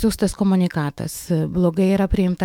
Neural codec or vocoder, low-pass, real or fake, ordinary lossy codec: autoencoder, 48 kHz, 128 numbers a frame, DAC-VAE, trained on Japanese speech; 19.8 kHz; fake; MP3, 96 kbps